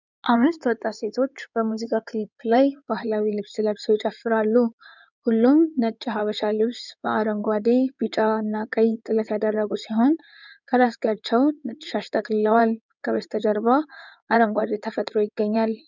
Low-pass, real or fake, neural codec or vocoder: 7.2 kHz; fake; codec, 16 kHz in and 24 kHz out, 2.2 kbps, FireRedTTS-2 codec